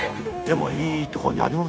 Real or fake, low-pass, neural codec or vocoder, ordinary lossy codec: fake; none; codec, 16 kHz, 0.9 kbps, LongCat-Audio-Codec; none